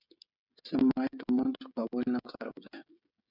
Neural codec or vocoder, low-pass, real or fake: codec, 16 kHz, 16 kbps, FreqCodec, smaller model; 5.4 kHz; fake